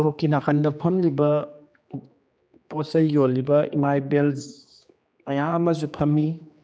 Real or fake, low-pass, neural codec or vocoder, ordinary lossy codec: fake; none; codec, 16 kHz, 2 kbps, X-Codec, HuBERT features, trained on general audio; none